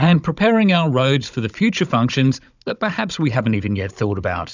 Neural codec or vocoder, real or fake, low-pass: codec, 16 kHz, 16 kbps, FunCodec, trained on Chinese and English, 50 frames a second; fake; 7.2 kHz